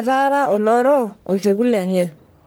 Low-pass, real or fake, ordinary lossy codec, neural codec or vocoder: none; fake; none; codec, 44.1 kHz, 1.7 kbps, Pupu-Codec